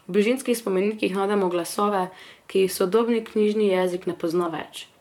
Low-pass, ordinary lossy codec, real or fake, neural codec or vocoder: 19.8 kHz; none; fake; vocoder, 44.1 kHz, 128 mel bands, Pupu-Vocoder